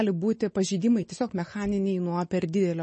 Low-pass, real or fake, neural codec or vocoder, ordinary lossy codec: 10.8 kHz; real; none; MP3, 32 kbps